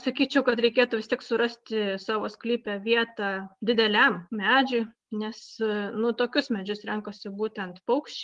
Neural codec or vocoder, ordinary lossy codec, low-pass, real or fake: none; Opus, 24 kbps; 10.8 kHz; real